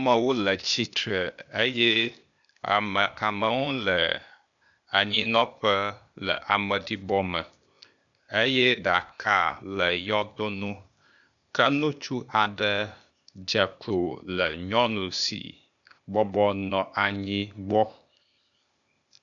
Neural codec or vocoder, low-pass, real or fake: codec, 16 kHz, 0.8 kbps, ZipCodec; 7.2 kHz; fake